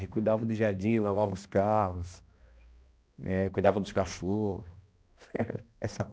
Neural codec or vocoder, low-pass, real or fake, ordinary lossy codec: codec, 16 kHz, 1 kbps, X-Codec, HuBERT features, trained on balanced general audio; none; fake; none